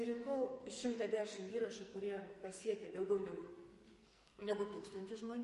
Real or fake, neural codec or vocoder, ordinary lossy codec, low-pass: fake; codec, 44.1 kHz, 2.6 kbps, SNAC; MP3, 48 kbps; 14.4 kHz